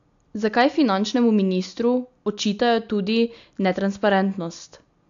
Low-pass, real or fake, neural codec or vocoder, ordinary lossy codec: 7.2 kHz; real; none; AAC, 64 kbps